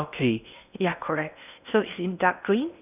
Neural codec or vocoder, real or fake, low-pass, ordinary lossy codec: codec, 16 kHz in and 24 kHz out, 0.8 kbps, FocalCodec, streaming, 65536 codes; fake; 3.6 kHz; none